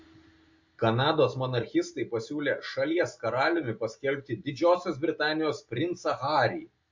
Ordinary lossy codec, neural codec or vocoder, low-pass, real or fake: MP3, 48 kbps; none; 7.2 kHz; real